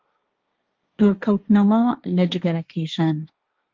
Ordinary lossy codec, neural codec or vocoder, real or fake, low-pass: Opus, 32 kbps; codec, 16 kHz, 1.1 kbps, Voila-Tokenizer; fake; 7.2 kHz